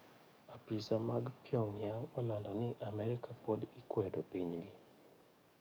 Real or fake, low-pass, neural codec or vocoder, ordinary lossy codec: fake; none; codec, 44.1 kHz, 7.8 kbps, DAC; none